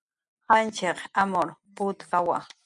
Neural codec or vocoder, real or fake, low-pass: none; real; 10.8 kHz